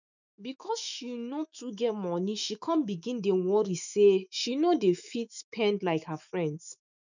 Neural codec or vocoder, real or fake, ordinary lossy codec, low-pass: autoencoder, 48 kHz, 128 numbers a frame, DAC-VAE, trained on Japanese speech; fake; none; 7.2 kHz